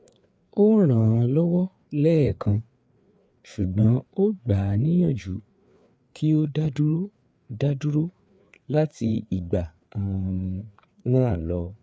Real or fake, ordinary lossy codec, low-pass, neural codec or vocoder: fake; none; none; codec, 16 kHz, 4 kbps, FreqCodec, larger model